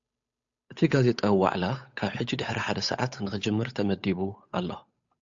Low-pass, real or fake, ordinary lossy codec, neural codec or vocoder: 7.2 kHz; fake; AAC, 64 kbps; codec, 16 kHz, 8 kbps, FunCodec, trained on Chinese and English, 25 frames a second